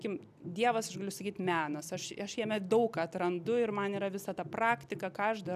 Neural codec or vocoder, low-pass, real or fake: none; 14.4 kHz; real